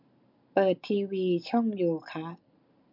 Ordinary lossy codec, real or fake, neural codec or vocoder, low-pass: MP3, 48 kbps; real; none; 5.4 kHz